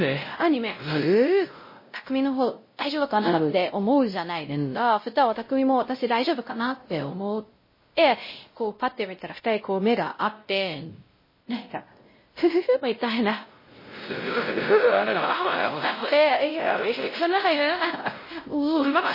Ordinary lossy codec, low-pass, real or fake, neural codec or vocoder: MP3, 24 kbps; 5.4 kHz; fake; codec, 16 kHz, 0.5 kbps, X-Codec, WavLM features, trained on Multilingual LibriSpeech